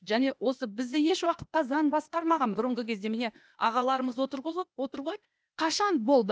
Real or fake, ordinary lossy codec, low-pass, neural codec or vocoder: fake; none; none; codec, 16 kHz, 0.8 kbps, ZipCodec